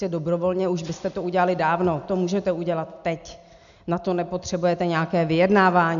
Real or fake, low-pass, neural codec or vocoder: real; 7.2 kHz; none